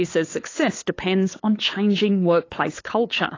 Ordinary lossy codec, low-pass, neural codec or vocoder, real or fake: AAC, 32 kbps; 7.2 kHz; codec, 16 kHz, 8 kbps, FunCodec, trained on LibriTTS, 25 frames a second; fake